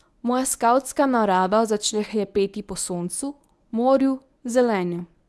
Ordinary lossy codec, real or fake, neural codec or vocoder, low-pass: none; fake; codec, 24 kHz, 0.9 kbps, WavTokenizer, medium speech release version 1; none